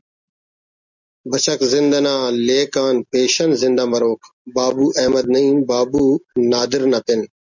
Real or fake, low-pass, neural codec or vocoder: real; 7.2 kHz; none